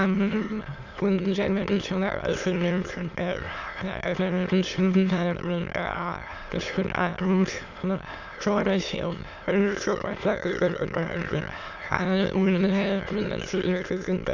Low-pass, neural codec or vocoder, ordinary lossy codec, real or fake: 7.2 kHz; autoencoder, 22.05 kHz, a latent of 192 numbers a frame, VITS, trained on many speakers; none; fake